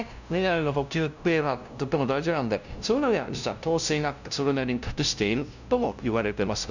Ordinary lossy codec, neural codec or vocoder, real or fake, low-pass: none; codec, 16 kHz, 0.5 kbps, FunCodec, trained on LibriTTS, 25 frames a second; fake; 7.2 kHz